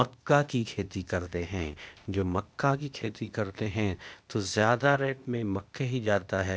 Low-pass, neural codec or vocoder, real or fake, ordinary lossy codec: none; codec, 16 kHz, 0.8 kbps, ZipCodec; fake; none